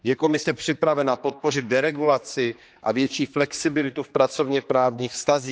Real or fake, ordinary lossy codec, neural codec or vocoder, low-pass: fake; none; codec, 16 kHz, 2 kbps, X-Codec, HuBERT features, trained on general audio; none